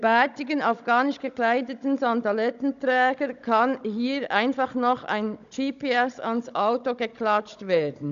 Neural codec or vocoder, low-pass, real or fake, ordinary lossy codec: codec, 16 kHz, 16 kbps, FunCodec, trained on Chinese and English, 50 frames a second; 7.2 kHz; fake; none